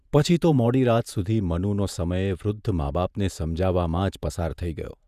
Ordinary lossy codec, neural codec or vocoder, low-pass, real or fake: none; vocoder, 48 kHz, 128 mel bands, Vocos; 14.4 kHz; fake